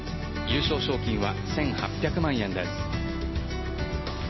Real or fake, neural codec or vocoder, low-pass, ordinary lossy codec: real; none; 7.2 kHz; MP3, 24 kbps